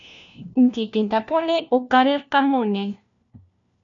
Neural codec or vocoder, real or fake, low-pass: codec, 16 kHz, 1 kbps, FunCodec, trained on LibriTTS, 50 frames a second; fake; 7.2 kHz